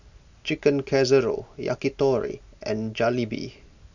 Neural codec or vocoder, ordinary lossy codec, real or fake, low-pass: none; none; real; 7.2 kHz